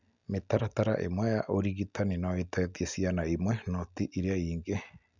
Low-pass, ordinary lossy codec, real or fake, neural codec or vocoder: 7.2 kHz; none; real; none